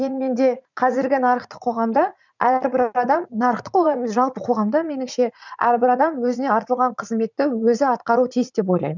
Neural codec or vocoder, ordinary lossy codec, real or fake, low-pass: vocoder, 44.1 kHz, 80 mel bands, Vocos; none; fake; 7.2 kHz